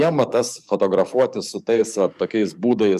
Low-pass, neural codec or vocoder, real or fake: 14.4 kHz; codec, 44.1 kHz, 7.8 kbps, DAC; fake